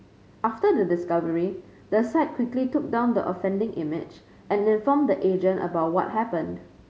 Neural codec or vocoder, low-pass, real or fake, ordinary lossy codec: none; none; real; none